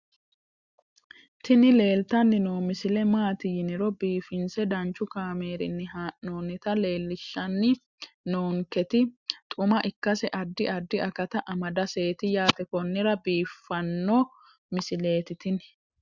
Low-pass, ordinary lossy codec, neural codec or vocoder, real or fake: 7.2 kHz; Opus, 64 kbps; none; real